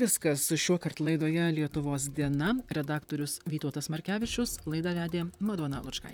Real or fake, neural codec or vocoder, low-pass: fake; vocoder, 44.1 kHz, 128 mel bands, Pupu-Vocoder; 19.8 kHz